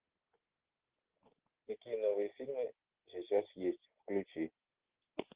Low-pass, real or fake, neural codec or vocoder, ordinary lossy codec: 3.6 kHz; real; none; Opus, 16 kbps